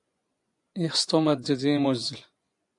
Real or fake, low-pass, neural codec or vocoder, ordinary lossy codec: fake; 10.8 kHz; vocoder, 24 kHz, 100 mel bands, Vocos; AAC, 64 kbps